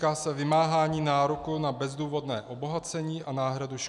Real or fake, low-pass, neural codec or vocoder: real; 10.8 kHz; none